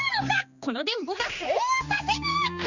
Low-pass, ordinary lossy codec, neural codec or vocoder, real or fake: 7.2 kHz; Opus, 64 kbps; codec, 16 kHz, 2 kbps, X-Codec, HuBERT features, trained on general audio; fake